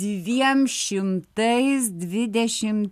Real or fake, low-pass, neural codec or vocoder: real; 14.4 kHz; none